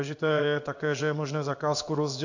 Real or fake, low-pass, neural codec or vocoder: fake; 7.2 kHz; codec, 16 kHz in and 24 kHz out, 1 kbps, XY-Tokenizer